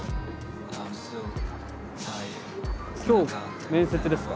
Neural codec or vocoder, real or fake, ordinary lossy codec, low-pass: none; real; none; none